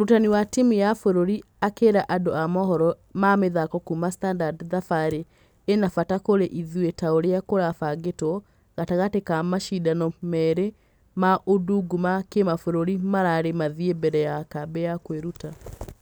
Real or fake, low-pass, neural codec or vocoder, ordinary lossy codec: real; none; none; none